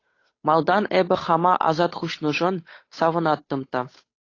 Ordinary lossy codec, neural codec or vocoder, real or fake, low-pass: AAC, 32 kbps; codec, 16 kHz, 8 kbps, FunCodec, trained on Chinese and English, 25 frames a second; fake; 7.2 kHz